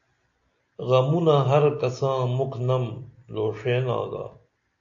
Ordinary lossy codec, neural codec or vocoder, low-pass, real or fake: AAC, 64 kbps; none; 7.2 kHz; real